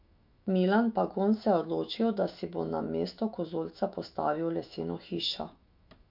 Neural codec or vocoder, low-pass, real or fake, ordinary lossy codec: autoencoder, 48 kHz, 128 numbers a frame, DAC-VAE, trained on Japanese speech; 5.4 kHz; fake; MP3, 48 kbps